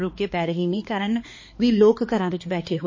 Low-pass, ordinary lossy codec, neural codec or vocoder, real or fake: 7.2 kHz; MP3, 32 kbps; codec, 16 kHz, 2 kbps, X-Codec, HuBERT features, trained on balanced general audio; fake